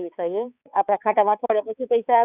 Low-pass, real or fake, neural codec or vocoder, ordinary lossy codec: 3.6 kHz; fake; codec, 16 kHz, 4 kbps, X-Codec, HuBERT features, trained on balanced general audio; Opus, 64 kbps